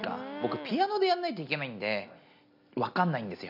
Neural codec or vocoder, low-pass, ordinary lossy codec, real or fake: none; 5.4 kHz; none; real